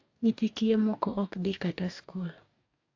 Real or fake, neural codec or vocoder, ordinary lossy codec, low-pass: fake; codec, 44.1 kHz, 2.6 kbps, DAC; AAC, 48 kbps; 7.2 kHz